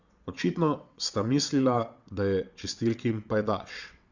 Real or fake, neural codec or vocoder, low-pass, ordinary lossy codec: fake; vocoder, 22.05 kHz, 80 mel bands, WaveNeXt; 7.2 kHz; Opus, 64 kbps